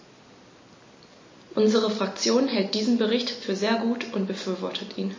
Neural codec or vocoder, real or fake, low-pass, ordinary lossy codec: none; real; 7.2 kHz; MP3, 32 kbps